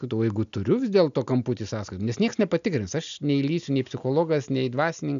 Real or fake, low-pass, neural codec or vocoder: real; 7.2 kHz; none